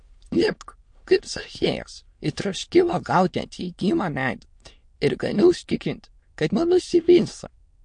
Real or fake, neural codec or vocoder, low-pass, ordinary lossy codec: fake; autoencoder, 22.05 kHz, a latent of 192 numbers a frame, VITS, trained on many speakers; 9.9 kHz; MP3, 48 kbps